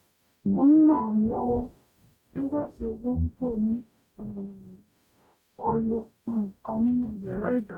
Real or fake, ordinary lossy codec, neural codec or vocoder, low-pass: fake; none; codec, 44.1 kHz, 0.9 kbps, DAC; 19.8 kHz